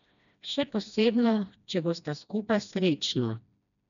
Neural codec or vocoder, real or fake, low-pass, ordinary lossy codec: codec, 16 kHz, 1 kbps, FreqCodec, smaller model; fake; 7.2 kHz; none